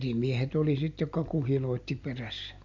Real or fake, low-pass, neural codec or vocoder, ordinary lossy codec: real; 7.2 kHz; none; AAC, 48 kbps